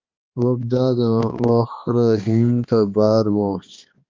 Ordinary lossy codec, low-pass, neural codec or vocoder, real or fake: Opus, 24 kbps; 7.2 kHz; codec, 16 kHz, 2 kbps, X-Codec, HuBERT features, trained on balanced general audio; fake